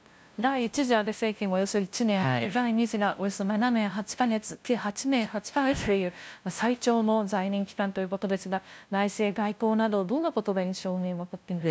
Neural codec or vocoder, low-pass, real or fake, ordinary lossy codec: codec, 16 kHz, 0.5 kbps, FunCodec, trained on LibriTTS, 25 frames a second; none; fake; none